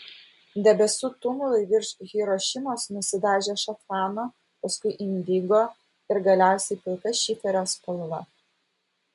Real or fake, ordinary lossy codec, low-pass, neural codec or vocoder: real; MP3, 64 kbps; 10.8 kHz; none